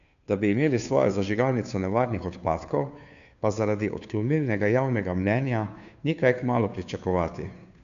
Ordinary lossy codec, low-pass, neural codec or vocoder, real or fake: none; 7.2 kHz; codec, 16 kHz, 2 kbps, FunCodec, trained on Chinese and English, 25 frames a second; fake